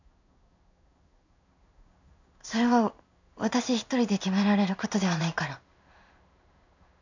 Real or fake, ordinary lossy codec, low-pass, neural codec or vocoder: fake; none; 7.2 kHz; codec, 16 kHz in and 24 kHz out, 1 kbps, XY-Tokenizer